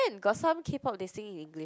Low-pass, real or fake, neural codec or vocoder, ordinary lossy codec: none; real; none; none